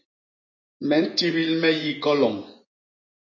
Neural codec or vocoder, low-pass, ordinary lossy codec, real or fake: vocoder, 24 kHz, 100 mel bands, Vocos; 7.2 kHz; MP3, 32 kbps; fake